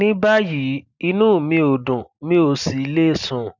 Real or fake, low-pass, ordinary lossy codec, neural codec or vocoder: real; 7.2 kHz; none; none